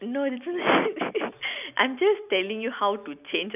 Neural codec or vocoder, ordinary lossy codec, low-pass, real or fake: none; none; 3.6 kHz; real